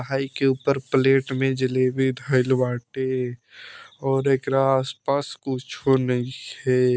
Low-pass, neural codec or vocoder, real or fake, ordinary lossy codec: none; none; real; none